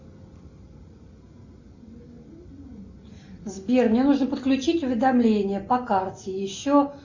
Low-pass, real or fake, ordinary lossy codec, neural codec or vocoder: 7.2 kHz; real; Opus, 64 kbps; none